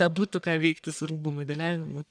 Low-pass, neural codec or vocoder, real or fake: 9.9 kHz; codec, 44.1 kHz, 1.7 kbps, Pupu-Codec; fake